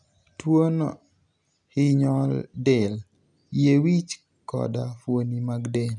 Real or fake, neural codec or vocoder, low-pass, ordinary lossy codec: real; none; 10.8 kHz; none